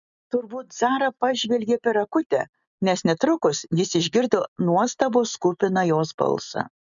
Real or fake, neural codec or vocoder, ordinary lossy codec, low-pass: real; none; MP3, 96 kbps; 7.2 kHz